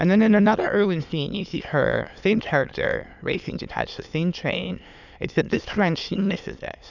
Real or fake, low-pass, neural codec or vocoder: fake; 7.2 kHz; autoencoder, 22.05 kHz, a latent of 192 numbers a frame, VITS, trained on many speakers